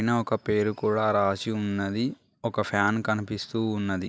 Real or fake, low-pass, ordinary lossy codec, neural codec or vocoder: real; none; none; none